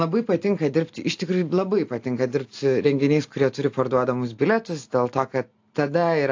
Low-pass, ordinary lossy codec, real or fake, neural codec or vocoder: 7.2 kHz; AAC, 48 kbps; real; none